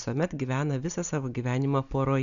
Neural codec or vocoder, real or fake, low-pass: none; real; 7.2 kHz